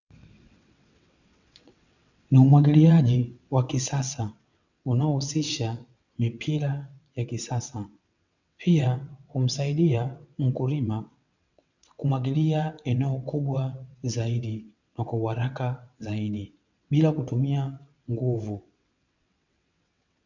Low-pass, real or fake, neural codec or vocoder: 7.2 kHz; fake; vocoder, 22.05 kHz, 80 mel bands, WaveNeXt